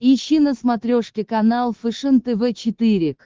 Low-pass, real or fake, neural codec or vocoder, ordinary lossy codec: 7.2 kHz; fake; codec, 24 kHz, 3.1 kbps, DualCodec; Opus, 16 kbps